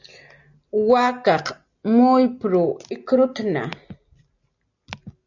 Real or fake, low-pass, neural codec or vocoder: real; 7.2 kHz; none